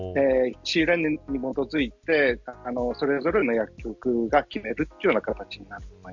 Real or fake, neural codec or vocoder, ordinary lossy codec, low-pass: real; none; Opus, 64 kbps; 7.2 kHz